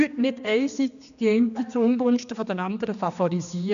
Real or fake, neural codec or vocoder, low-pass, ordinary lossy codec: fake; codec, 16 kHz, 2 kbps, X-Codec, HuBERT features, trained on general audio; 7.2 kHz; AAC, 96 kbps